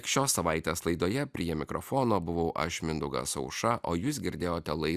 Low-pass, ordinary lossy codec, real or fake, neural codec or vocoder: 14.4 kHz; Opus, 64 kbps; real; none